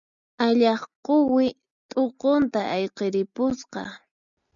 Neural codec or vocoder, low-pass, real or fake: none; 7.2 kHz; real